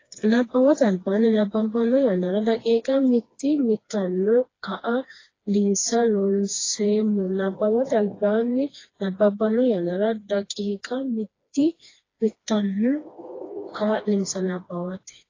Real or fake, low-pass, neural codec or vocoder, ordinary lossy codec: fake; 7.2 kHz; codec, 16 kHz, 2 kbps, FreqCodec, smaller model; AAC, 32 kbps